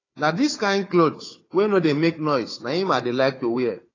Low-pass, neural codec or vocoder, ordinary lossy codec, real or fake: 7.2 kHz; codec, 16 kHz, 4 kbps, FunCodec, trained on Chinese and English, 50 frames a second; AAC, 32 kbps; fake